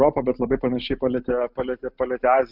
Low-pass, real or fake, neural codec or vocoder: 5.4 kHz; real; none